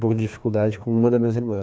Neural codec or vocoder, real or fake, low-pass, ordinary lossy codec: codec, 16 kHz, 2 kbps, FreqCodec, larger model; fake; none; none